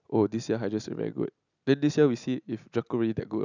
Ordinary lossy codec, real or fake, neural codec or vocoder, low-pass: none; real; none; 7.2 kHz